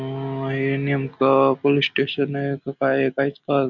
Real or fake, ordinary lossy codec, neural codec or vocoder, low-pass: real; none; none; 7.2 kHz